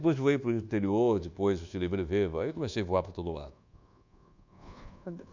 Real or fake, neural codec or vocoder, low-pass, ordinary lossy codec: fake; codec, 24 kHz, 1.2 kbps, DualCodec; 7.2 kHz; none